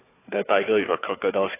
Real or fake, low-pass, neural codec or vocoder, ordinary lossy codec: fake; 3.6 kHz; codec, 16 kHz, 4 kbps, FunCodec, trained on Chinese and English, 50 frames a second; AAC, 16 kbps